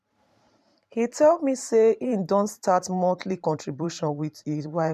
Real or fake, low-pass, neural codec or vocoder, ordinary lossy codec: fake; 14.4 kHz; vocoder, 44.1 kHz, 128 mel bands every 512 samples, BigVGAN v2; MP3, 96 kbps